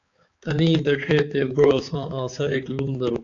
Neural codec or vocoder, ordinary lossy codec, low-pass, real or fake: codec, 16 kHz, 4 kbps, X-Codec, HuBERT features, trained on balanced general audio; AAC, 48 kbps; 7.2 kHz; fake